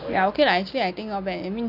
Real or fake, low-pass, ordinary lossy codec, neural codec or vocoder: real; 5.4 kHz; AAC, 48 kbps; none